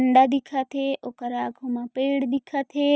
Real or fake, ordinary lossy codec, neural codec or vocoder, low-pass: real; none; none; none